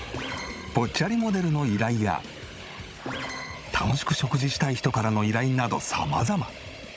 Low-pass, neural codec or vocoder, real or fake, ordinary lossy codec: none; codec, 16 kHz, 16 kbps, FunCodec, trained on Chinese and English, 50 frames a second; fake; none